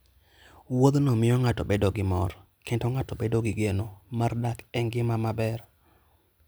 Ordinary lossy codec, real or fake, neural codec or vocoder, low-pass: none; real; none; none